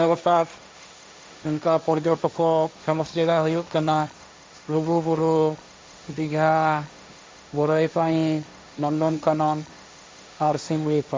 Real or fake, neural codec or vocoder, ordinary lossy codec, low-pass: fake; codec, 16 kHz, 1.1 kbps, Voila-Tokenizer; none; none